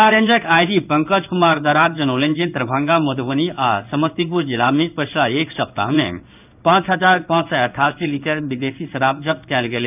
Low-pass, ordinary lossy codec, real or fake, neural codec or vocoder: 3.6 kHz; none; fake; codec, 16 kHz in and 24 kHz out, 1 kbps, XY-Tokenizer